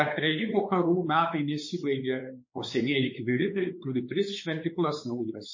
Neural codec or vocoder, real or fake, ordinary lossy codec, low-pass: codec, 16 kHz, 4 kbps, X-Codec, WavLM features, trained on Multilingual LibriSpeech; fake; MP3, 32 kbps; 7.2 kHz